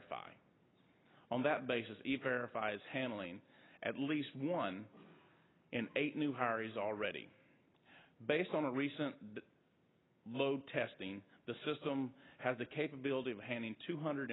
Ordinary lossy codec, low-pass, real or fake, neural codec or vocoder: AAC, 16 kbps; 7.2 kHz; real; none